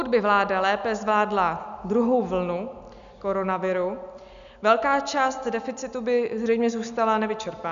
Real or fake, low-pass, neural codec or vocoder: real; 7.2 kHz; none